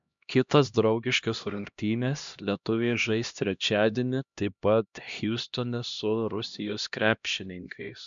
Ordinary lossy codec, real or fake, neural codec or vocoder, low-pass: MP3, 64 kbps; fake; codec, 16 kHz, 1 kbps, X-Codec, HuBERT features, trained on LibriSpeech; 7.2 kHz